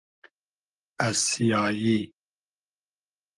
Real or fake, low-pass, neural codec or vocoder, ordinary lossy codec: real; 10.8 kHz; none; Opus, 24 kbps